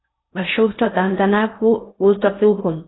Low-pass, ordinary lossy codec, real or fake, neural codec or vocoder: 7.2 kHz; AAC, 16 kbps; fake; codec, 16 kHz in and 24 kHz out, 0.6 kbps, FocalCodec, streaming, 4096 codes